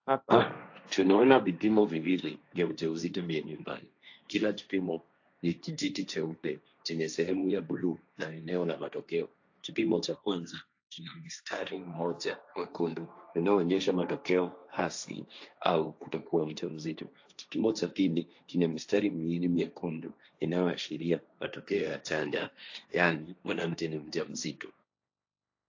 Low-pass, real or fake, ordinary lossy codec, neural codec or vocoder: 7.2 kHz; fake; AAC, 48 kbps; codec, 16 kHz, 1.1 kbps, Voila-Tokenizer